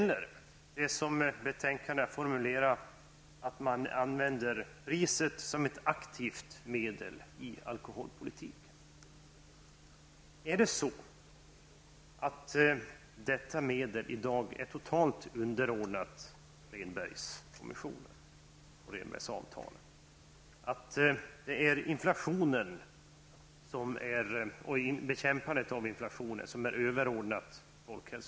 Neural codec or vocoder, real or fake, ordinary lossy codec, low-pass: none; real; none; none